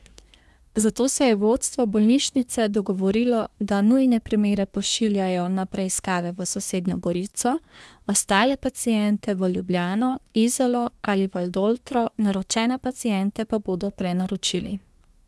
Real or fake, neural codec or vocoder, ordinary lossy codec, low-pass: fake; codec, 24 kHz, 1 kbps, SNAC; none; none